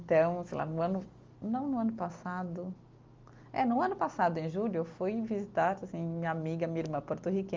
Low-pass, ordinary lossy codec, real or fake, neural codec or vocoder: 7.2 kHz; Opus, 32 kbps; real; none